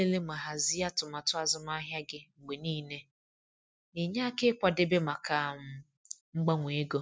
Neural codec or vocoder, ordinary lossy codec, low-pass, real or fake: none; none; none; real